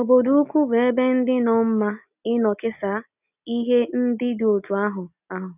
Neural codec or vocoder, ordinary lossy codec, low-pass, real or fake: none; none; 3.6 kHz; real